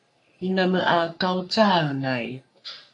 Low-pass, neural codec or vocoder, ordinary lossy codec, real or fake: 10.8 kHz; codec, 44.1 kHz, 3.4 kbps, Pupu-Codec; AAC, 64 kbps; fake